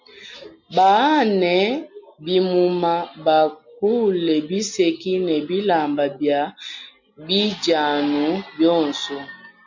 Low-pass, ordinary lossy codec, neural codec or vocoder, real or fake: 7.2 kHz; MP3, 48 kbps; none; real